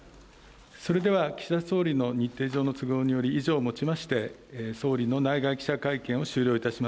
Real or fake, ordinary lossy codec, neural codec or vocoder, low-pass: real; none; none; none